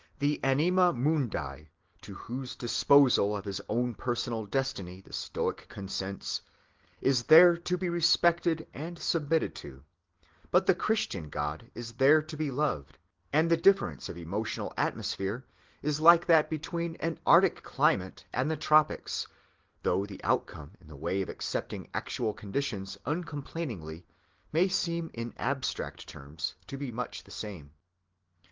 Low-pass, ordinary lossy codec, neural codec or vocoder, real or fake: 7.2 kHz; Opus, 16 kbps; none; real